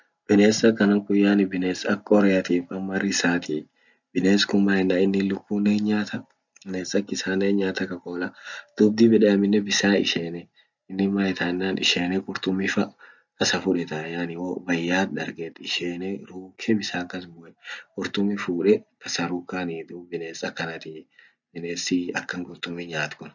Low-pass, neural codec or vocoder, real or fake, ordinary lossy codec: 7.2 kHz; none; real; none